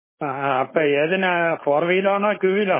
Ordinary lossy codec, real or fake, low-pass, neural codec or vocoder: MP3, 16 kbps; fake; 3.6 kHz; codec, 16 kHz, 4.8 kbps, FACodec